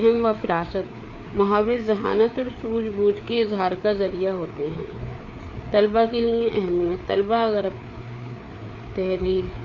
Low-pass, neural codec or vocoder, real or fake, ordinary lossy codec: 7.2 kHz; codec, 16 kHz, 4 kbps, FreqCodec, larger model; fake; none